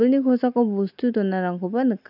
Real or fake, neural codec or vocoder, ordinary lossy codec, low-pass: real; none; none; 5.4 kHz